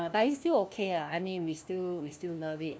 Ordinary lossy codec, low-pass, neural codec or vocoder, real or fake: none; none; codec, 16 kHz, 1 kbps, FunCodec, trained on LibriTTS, 50 frames a second; fake